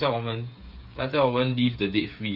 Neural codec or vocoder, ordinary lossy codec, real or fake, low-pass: codec, 16 kHz, 8 kbps, FreqCodec, smaller model; none; fake; 5.4 kHz